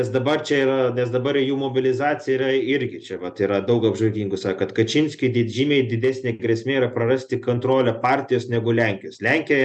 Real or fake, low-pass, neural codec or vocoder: real; 9.9 kHz; none